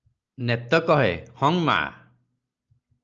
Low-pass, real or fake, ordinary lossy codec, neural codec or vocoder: 7.2 kHz; real; Opus, 24 kbps; none